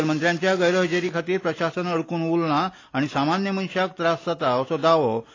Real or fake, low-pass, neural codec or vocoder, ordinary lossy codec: real; 7.2 kHz; none; AAC, 32 kbps